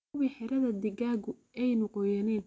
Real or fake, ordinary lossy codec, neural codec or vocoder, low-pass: real; none; none; none